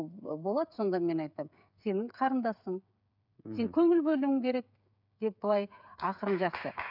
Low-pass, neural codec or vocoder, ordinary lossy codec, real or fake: 5.4 kHz; codec, 16 kHz, 8 kbps, FreqCodec, smaller model; none; fake